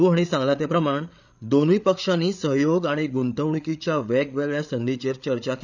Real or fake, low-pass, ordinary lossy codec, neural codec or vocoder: fake; 7.2 kHz; none; codec, 16 kHz, 8 kbps, FreqCodec, larger model